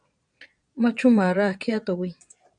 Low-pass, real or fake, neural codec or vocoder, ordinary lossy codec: 9.9 kHz; fake; vocoder, 22.05 kHz, 80 mel bands, Vocos; MP3, 96 kbps